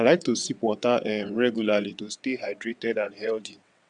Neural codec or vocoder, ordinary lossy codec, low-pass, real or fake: vocoder, 22.05 kHz, 80 mel bands, WaveNeXt; Opus, 64 kbps; 9.9 kHz; fake